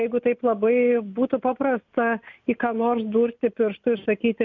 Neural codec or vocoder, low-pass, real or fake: none; 7.2 kHz; real